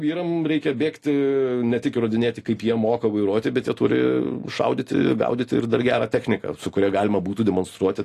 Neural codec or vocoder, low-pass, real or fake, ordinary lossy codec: none; 14.4 kHz; real; AAC, 48 kbps